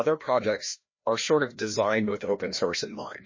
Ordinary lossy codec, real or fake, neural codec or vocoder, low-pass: MP3, 32 kbps; fake; codec, 16 kHz, 1 kbps, FreqCodec, larger model; 7.2 kHz